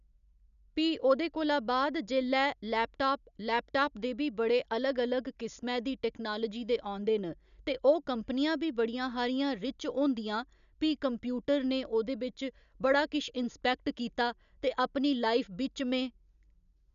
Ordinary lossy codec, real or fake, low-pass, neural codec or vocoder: none; real; 7.2 kHz; none